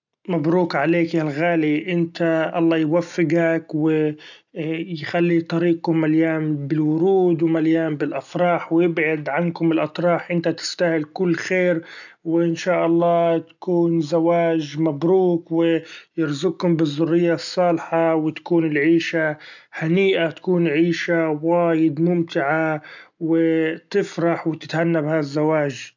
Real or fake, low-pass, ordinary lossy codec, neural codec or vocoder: real; 7.2 kHz; none; none